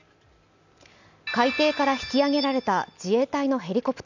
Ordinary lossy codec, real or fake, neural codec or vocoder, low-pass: none; real; none; 7.2 kHz